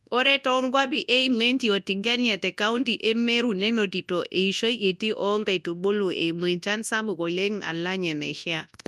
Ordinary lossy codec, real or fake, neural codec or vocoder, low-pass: none; fake; codec, 24 kHz, 0.9 kbps, WavTokenizer, large speech release; none